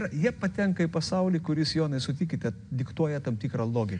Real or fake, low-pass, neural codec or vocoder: real; 9.9 kHz; none